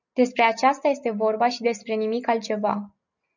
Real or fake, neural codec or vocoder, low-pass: real; none; 7.2 kHz